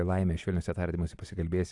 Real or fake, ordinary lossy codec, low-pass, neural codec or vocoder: real; AAC, 64 kbps; 10.8 kHz; none